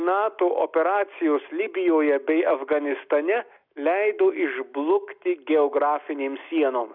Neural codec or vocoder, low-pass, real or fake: none; 5.4 kHz; real